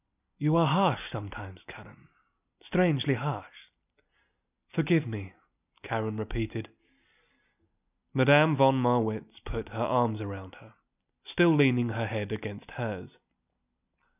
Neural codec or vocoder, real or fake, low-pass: none; real; 3.6 kHz